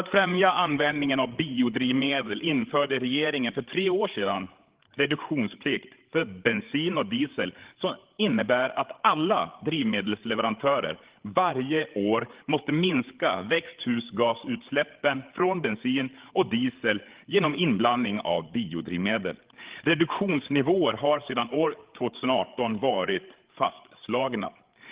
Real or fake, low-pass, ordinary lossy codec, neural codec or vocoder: fake; 3.6 kHz; Opus, 16 kbps; codec, 16 kHz, 8 kbps, FreqCodec, larger model